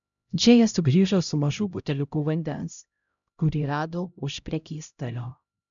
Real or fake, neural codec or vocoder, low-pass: fake; codec, 16 kHz, 0.5 kbps, X-Codec, HuBERT features, trained on LibriSpeech; 7.2 kHz